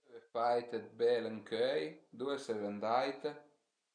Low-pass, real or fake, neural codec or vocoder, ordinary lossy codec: 9.9 kHz; real; none; none